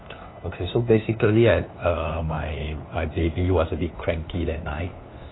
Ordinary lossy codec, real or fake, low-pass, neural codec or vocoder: AAC, 16 kbps; fake; 7.2 kHz; codec, 16 kHz, 2 kbps, FunCodec, trained on LibriTTS, 25 frames a second